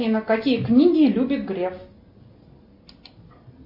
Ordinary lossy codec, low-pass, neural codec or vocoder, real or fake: MP3, 32 kbps; 5.4 kHz; none; real